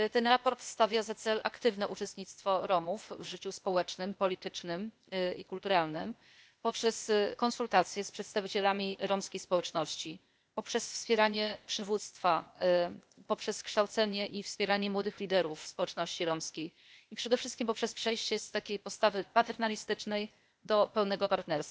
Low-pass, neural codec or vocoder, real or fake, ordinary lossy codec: none; codec, 16 kHz, 0.8 kbps, ZipCodec; fake; none